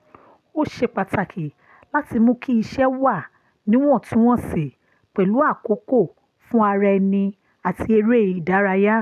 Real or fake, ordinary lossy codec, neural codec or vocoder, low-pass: real; none; none; 14.4 kHz